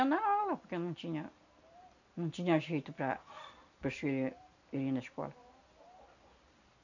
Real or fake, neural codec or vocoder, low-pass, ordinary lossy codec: real; none; 7.2 kHz; none